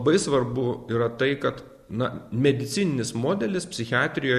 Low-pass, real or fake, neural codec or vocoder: 14.4 kHz; real; none